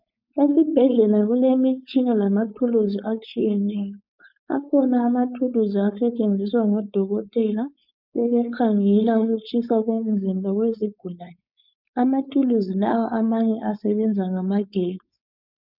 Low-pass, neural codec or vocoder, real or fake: 5.4 kHz; codec, 16 kHz, 4.8 kbps, FACodec; fake